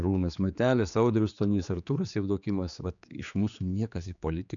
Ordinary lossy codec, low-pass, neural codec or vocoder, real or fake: MP3, 96 kbps; 7.2 kHz; codec, 16 kHz, 4 kbps, X-Codec, HuBERT features, trained on general audio; fake